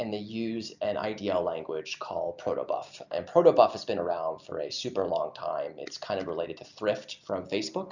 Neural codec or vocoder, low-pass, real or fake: none; 7.2 kHz; real